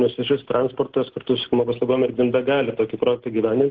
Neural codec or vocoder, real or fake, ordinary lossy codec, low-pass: none; real; Opus, 16 kbps; 7.2 kHz